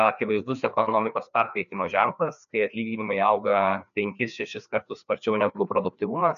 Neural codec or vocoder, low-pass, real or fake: codec, 16 kHz, 2 kbps, FreqCodec, larger model; 7.2 kHz; fake